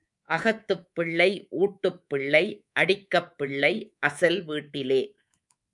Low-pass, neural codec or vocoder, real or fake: 10.8 kHz; codec, 24 kHz, 3.1 kbps, DualCodec; fake